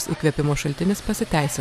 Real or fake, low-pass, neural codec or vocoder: fake; 14.4 kHz; vocoder, 44.1 kHz, 128 mel bands every 512 samples, BigVGAN v2